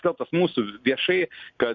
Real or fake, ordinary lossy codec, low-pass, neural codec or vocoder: real; MP3, 48 kbps; 7.2 kHz; none